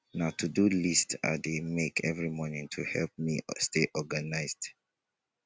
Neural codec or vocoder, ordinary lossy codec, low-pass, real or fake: none; none; none; real